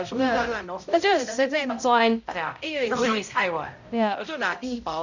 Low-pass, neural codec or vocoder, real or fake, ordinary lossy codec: 7.2 kHz; codec, 16 kHz, 0.5 kbps, X-Codec, HuBERT features, trained on balanced general audio; fake; none